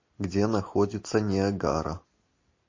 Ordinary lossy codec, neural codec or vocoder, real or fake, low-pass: MP3, 32 kbps; none; real; 7.2 kHz